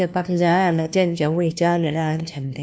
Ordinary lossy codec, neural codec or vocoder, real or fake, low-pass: none; codec, 16 kHz, 1 kbps, FunCodec, trained on LibriTTS, 50 frames a second; fake; none